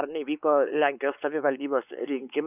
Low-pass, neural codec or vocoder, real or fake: 3.6 kHz; codec, 16 kHz, 4 kbps, X-Codec, WavLM features, trained on Multilingual LibriSpeech; fake